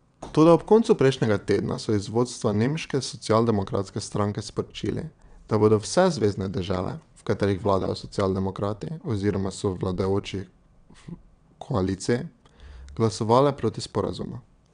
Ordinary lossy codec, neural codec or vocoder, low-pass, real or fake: none; vocoder, 22.05 kHz, 80 mel bands, WaveNeXt; 9.9 kHz; fake